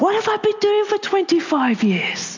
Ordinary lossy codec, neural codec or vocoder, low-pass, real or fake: AAC, 48 kbps; none; 7.2 kHz; real